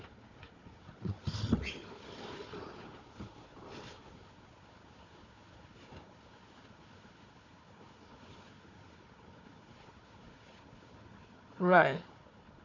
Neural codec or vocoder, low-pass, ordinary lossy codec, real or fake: codec, 16 kHz, 4 kbps, FunCodec, trained on Chinese and English, 50 frames a second; 7.2 kHz; AAC, 48 kbps; fake